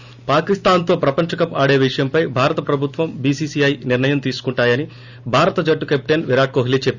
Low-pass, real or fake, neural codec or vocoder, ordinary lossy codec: 7.2 kHz; real; none; Opus, 64 kbps